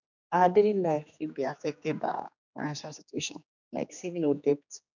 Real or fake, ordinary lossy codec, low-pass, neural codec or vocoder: fake; none; 7.2 kHz; codec, 16 kHz, 2 kbps, X-Codec, HuBERT features, trained on general audio